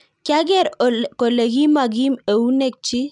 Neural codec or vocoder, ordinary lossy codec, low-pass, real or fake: none; none; 10.8 kHz; real